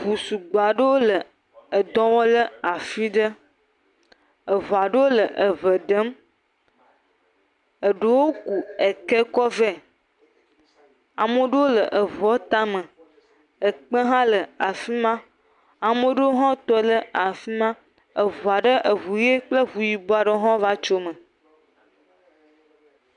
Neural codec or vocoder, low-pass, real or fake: none; 10.8 kHz; real